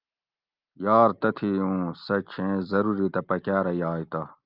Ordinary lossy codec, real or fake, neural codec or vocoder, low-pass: Opus, 24 kbps; real; none; 5.4 kHz